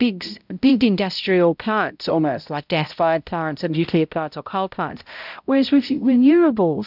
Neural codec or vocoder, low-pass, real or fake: codec, 16 kHz, 0.5 kbps, X-Codec, HuBERT features, trained on balanced general audio; 5.4 kHz; fake